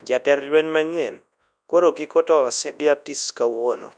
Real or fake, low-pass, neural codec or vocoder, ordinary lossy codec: fake; 9.9 kHz; codec, 24 kHz, 0.9 kbps, WavTokenizer, large speech release; none